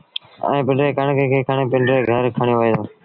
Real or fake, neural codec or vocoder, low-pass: real; none; 5.4 kHz